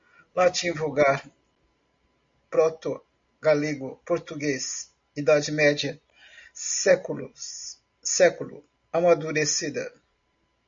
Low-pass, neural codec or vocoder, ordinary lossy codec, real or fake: 7.2 kHz; none; MP3, 48 kbps; real